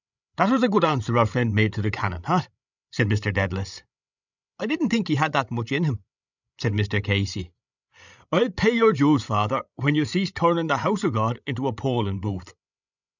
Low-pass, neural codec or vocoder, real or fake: 7.2 kHz; codec, 16 kHz, 16 kbps, FreqCodec, larger model; fake